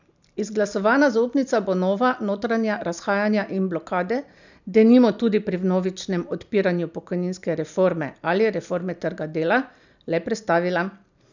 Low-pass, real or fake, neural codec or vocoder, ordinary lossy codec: 7.2 kHz; real; none; none